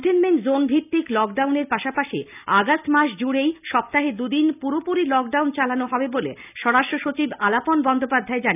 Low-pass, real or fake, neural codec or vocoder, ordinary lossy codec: 3.6 kHz; real; none; none